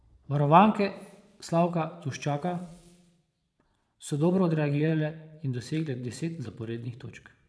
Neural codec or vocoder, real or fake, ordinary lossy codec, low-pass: vocoder, 22.05 kHz, 80 mel bands, Vocos; fake; none; none